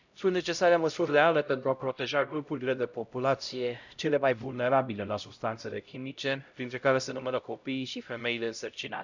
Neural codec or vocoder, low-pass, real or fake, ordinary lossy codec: codec, 16 kHz, 0.5 kbps, X-Codec, HuBERT features, trained on LibriSpeech; 7.2 kHz; fake; none